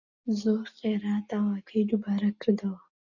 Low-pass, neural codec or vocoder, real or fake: 7.2 kHz; none; real